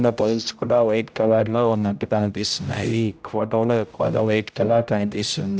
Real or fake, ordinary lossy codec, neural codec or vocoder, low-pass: fake; none; codec, 16 kHz, 0.5 kbps, X-Codec, HuBERT features, trained on general audio; none